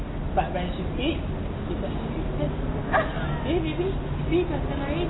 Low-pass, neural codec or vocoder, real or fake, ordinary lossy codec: 7.2 kHz; none; real; AAC, 16 kbps